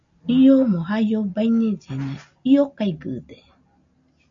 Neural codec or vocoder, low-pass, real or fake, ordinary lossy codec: none; 7.2 kHz; real; AAC, 64 kbps